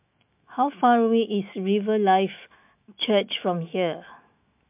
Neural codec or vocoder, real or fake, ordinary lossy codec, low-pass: autoencoder, 48 kHz, 128 numbers a frame, DAC-VAE, trained on Japanese speech; fake; MP3, 32 kbps; 3.6 kHz